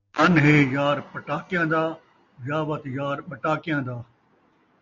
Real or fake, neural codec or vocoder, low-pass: real; none; 7.2 kHz